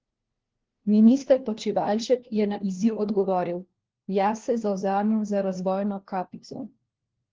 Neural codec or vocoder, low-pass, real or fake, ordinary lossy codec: codec, 16 kHz, 1 kbps, FunCodec, trained on LibriTTS, 50 frames a second; 7.2 kHz; fake; Opus, 16 kbps